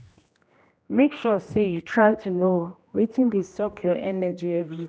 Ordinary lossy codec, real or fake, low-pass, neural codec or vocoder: none; fake; none; codec, 16 kHz, 1 kbps, X-Codec, HuBERT features, trained on general audio